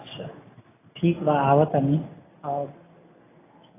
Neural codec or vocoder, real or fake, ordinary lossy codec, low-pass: none; real; AAC, 16 kbps; 3.6 kHz